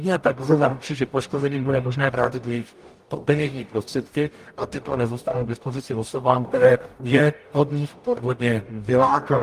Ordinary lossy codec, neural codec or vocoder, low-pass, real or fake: Opus, 32 kbps; codec, 44.1 kHz, 0.9 kbps, DAC; 14.4 kHz; fake